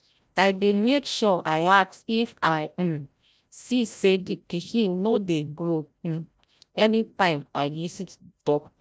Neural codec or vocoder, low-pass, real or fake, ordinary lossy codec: codec, 16 kHz, 0.5 kbps, FreqCodec, larger model; none; fake; none